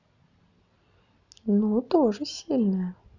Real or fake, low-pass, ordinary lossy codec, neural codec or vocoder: real; 7.2 kHz; none; none